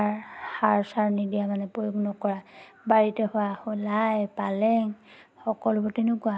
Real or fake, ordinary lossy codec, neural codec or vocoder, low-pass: real; none; none; none